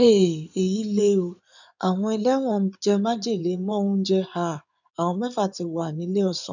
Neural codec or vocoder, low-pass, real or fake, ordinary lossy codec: codec, 16 kHz in and 24 kHz out, 2.2 kbps, FireRedTTS-2 codec; 7.2 kHz; fake; none